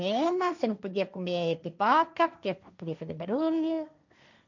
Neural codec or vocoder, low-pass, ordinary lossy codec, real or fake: codec, 16 kHz, 1.1 kbps, Voila-Tokenizer; 7.2 kHz; none; fake